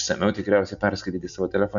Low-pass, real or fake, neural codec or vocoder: 7.2 kHz; real; none